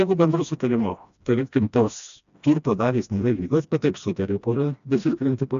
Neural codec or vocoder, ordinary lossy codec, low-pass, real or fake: codec, 16 kHz, 1 kbps, FreqCodec, smaller model; AAC, 96 kbps; 7.2 kHz; fake